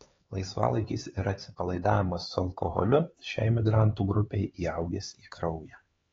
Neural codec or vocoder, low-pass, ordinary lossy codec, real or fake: codec, 16 kHz, 4 kbps, X-Codec, HuBERT features, trained on LibriSpeech; 7.2 kHz; AAC, 24 kbps; fake